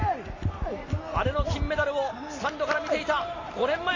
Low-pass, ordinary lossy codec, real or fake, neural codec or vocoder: 7.2 kHz; AAC, 32 kbps; real; none